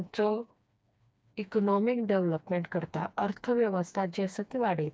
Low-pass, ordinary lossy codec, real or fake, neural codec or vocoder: none; none; fake; codec, 16 kHz, 2 kbps, FreqCodec, smaller model